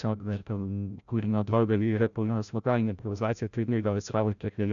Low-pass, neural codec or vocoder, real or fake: 7.2 kHz; codec, 16 kHz, 0.5 kbps, FreqCodec, larger model; fake